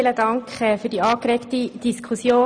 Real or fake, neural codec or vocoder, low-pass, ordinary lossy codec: real; none; 9.9 kHz; none